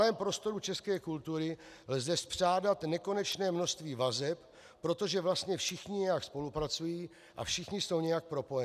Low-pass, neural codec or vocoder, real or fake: 14.4 kHz; none; real